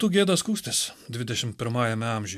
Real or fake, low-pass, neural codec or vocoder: real; 14.4 kHz; none